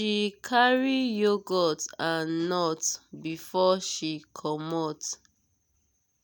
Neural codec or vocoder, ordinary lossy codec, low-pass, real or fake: none; none; none; real